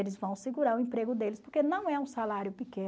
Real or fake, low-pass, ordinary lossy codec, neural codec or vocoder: real; none; none; none